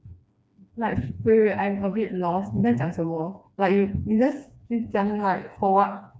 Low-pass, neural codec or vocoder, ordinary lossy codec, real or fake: none; codec, 16 kHz, 2 kbps, FreqCodec, smaller model; none; fake